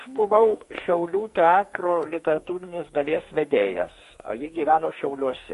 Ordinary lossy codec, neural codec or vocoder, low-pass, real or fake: MP3, 48 kbps; codec, 44.1 kHz, 2.6 kbps, SNAC; 14.4 kHz; fake